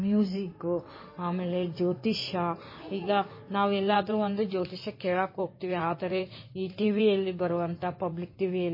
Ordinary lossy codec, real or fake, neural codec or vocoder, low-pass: MP3, 24 kbps; fake; codec, 16 kHz in and 24 kHz out, 2.2 kbps, FireRedTTS-2 codec; 5.4 kHz